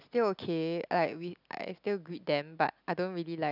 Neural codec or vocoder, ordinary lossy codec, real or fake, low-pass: none; none; real; 5.4 kHz